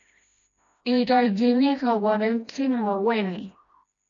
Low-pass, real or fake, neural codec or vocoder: 7.2 kHz; fake; codec, 16 kHz, 1 kbps, FreqCodec, smaller model